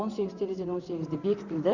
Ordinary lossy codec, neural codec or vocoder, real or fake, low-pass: none; vocoder, 44.1 kHz, 128 mel bands, Pupu-Vocoder; fake; 7.2 kHz